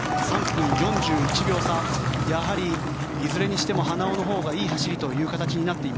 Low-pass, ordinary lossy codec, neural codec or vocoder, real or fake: none; none; none; real